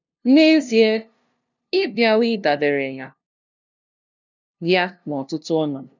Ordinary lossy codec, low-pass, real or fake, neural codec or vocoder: none; 7.2 kHz; fake; codec, 16 kHz, 0.5 kbps, FunCodec, trained on LibriTTS, 25 frames a second